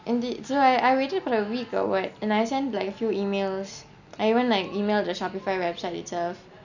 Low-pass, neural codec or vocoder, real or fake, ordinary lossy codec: 7.2 kHz; none; real; none